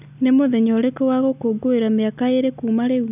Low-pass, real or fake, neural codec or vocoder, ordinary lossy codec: 3.6 kHz; real; none; none